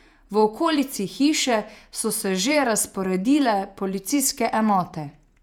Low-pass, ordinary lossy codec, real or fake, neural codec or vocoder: 19.8 kHz; none; fake; vocoder, 48 kHz, 128 mel bands, Vocos